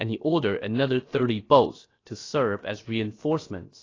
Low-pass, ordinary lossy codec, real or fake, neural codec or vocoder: 7.2 kHz; AAC, 32 kbps; fake; codec, 16 kHz, about 1 kbps, DyCAST, with the encoder's durations